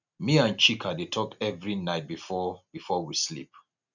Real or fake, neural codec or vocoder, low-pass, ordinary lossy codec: real; none; 7.2 kHz; none